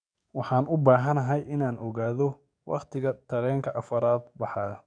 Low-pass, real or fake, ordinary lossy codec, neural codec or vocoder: 9.9 kHz; fake; none; codec, 44.1 kHz, 7.8 kbps, DAC